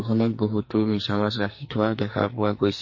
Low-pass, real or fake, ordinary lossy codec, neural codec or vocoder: 7.2 kHz; fake; MP3, 32 kbps; codec, 44.1 kHz, 2.6 kbps, SNAC